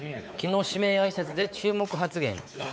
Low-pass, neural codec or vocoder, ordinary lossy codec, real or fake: none; codec, 16 kHz, 4 kbps, X-Codec, WavLM features, trained on Multilingual LibriSpeech; none; fake